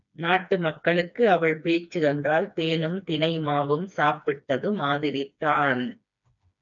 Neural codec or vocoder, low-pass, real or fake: codec, 16 kHz, 2 kbps, FreqCodec, smaller model; 7.2 kHz; fake